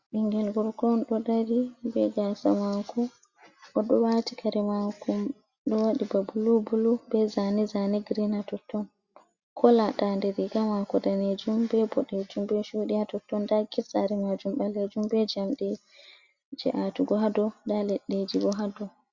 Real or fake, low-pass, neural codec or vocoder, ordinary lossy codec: real; 7.2 kHz; none; Opus, 64 kbps